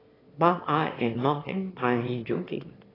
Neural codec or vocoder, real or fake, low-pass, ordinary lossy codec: autoencoder, 22.05 kHz, a latent of 192 numbers a frame, VITS, trained on one speaker; fake; 5.4 kHz; AAC, 24 kbps